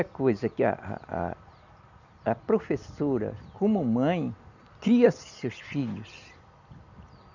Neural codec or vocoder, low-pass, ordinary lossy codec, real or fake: none; 7.2 kHz; none; real